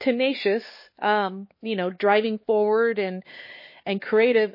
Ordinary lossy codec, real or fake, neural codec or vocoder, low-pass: MP3, 24 kbps; fake; codec, 16 kHz, 4 kbps, X-Codec, HuBERT features, trained on LibriSpeech; 5.4 kHz